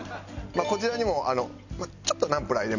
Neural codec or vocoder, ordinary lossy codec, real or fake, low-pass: none; none; real; 7.2 kHz